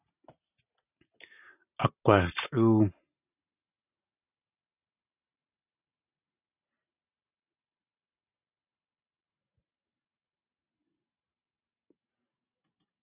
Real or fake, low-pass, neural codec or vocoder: fake; 3.6 kHz; vocoder, 44.1 kHz, 128 mel bands every 512 samples, BigVGAN v2